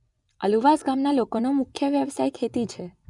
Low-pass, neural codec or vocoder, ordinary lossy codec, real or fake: 10.8 kHz; vocoder, 24 kHz, 100 mel bands, Vocos; none; fake